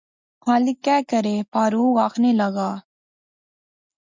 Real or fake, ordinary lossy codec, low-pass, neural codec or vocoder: real; MP3, 64 kbps; 7.2 kHz; none